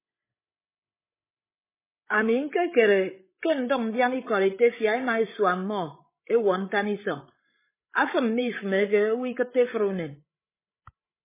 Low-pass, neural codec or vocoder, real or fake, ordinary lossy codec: 3.6 kHz; codec, 16 kHz, 16 kbps, FreqCodec, larger model; fake; MP3, 16 kbps